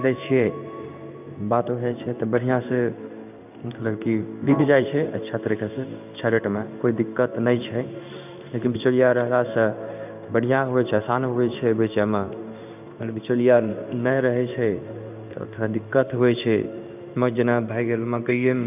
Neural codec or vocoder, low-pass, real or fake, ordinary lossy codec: codec, 16 kHz in and 24 kHz out, 1 kbps, XY-Tokenizer; 3.6 kHz; fake; none